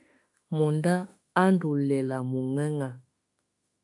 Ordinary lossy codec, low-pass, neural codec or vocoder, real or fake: AAC, 64 kbps; 10.8 kHz; autoencoder, 48 kHz, 32 numbers a frame, DAC-VAE, trained on Japanese speech; fake